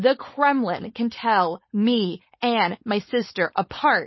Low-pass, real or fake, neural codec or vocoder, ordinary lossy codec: 7.2 kHz; fake; codec, 16 kHz, 4.8 kbps, FACodec; MP3, 24 kbps